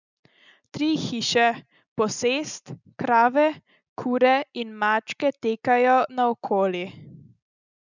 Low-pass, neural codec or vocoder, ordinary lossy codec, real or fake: 7.2 kHz; none; none; real